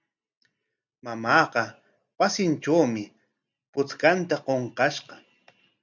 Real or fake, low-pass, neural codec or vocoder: real; 7.2 kHz; none